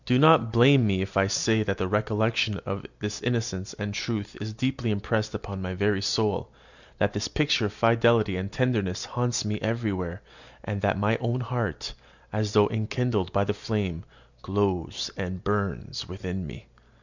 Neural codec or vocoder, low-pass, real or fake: none; 7.2 kHz; real